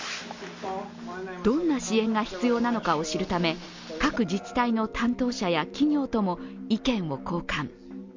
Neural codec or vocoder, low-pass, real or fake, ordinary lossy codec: none; 7.2 kHz; real; MP3, 64 kbps